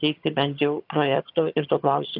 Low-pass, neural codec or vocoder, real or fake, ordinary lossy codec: 5.4 kHz; vocoder, 22.05 kHz, 80 mel bands, HiFi-GAN; fake; AAC, 48 kbps